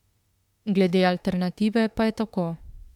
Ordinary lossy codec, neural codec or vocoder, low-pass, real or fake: MP3, 96 kbps; autoencoder, 48 kHz, 32 numbers a frame, DAC-VAE, trained on Japanese speech; 19.8 kHz; fake